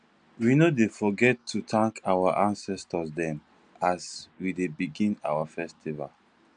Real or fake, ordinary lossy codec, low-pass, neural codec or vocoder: real; none; 9.9 kHz; none